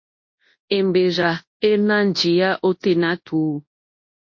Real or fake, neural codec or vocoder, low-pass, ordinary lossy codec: fake; codec, 24 kHz, 0.9 kbps, WavTokenizer, large speech release; 7.2 kHz; MP3, 32 kbps